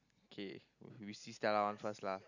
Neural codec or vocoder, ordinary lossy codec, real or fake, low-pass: none; none; real; 7.2 kHz